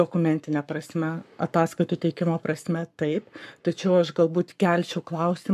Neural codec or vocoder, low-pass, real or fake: codec, 44.1 kHz, 7.8 kbps, Pupu-Codec; 14.4 kHz; fake